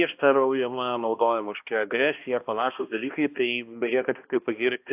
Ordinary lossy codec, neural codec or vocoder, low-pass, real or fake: AAC, 32 kbps; codec, 16 kHz, 1 kbps, X-Codec, HuBERT features, trained on balanced general audio; 3.6 kHz; fake